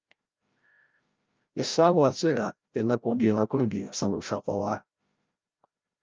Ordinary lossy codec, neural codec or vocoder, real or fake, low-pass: Opus, 24 kbps; codec, 16 kHz, 0.5 kbps, FreqCodec, larger model; fake; 7.2 kHz